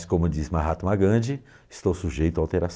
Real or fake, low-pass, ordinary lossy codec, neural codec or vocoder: real; none; none; none